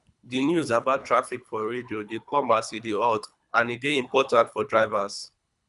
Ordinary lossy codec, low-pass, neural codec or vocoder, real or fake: none; 10.8 kHz; codec, 24 kHz, 3 kbps, HILCodec; fake